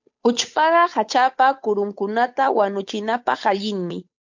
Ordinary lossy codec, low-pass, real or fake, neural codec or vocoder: MP3, 48 kbps; 7.2 kHz; fake; codec, 16 kHz, 8 kbps, FunCodec, trained on Chinese and English, 25 frames a second